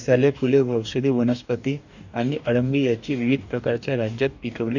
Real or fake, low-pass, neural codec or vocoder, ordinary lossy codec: fake; 7.2 kHz; codec, 44.1 kHz, 2.6 kbps, DAC; none